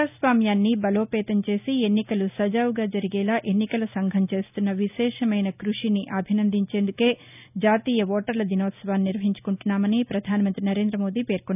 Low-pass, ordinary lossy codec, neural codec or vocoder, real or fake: 3.6 kHz; none; none; real